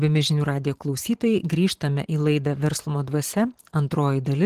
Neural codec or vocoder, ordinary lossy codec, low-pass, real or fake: vocoder, 44.1 kHz, 128 mel bands every 512 samples, BigVGAN v2; Opus, 16 kbps; 14.4 kHz; fake